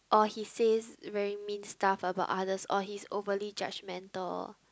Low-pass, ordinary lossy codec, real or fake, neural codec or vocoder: none; none; real; none